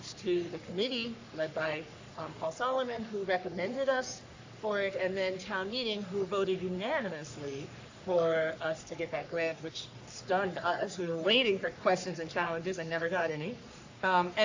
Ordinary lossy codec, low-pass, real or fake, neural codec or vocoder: AAC, 48 kbps; 7.2 kHz; fake; codec, 44.1 kHz, 3.4 kbps, Pupu-Codec